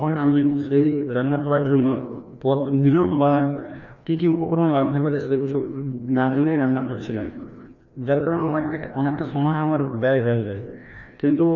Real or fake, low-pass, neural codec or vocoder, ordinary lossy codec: fake; 7.2 kHz; codec, 16 kHz, 1 kbps, FreqCodec, larger model; none